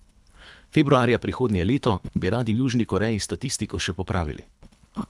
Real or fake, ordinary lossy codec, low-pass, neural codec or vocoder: fake; none; none; codec, 24 kHz, 3 kbps, HILCodec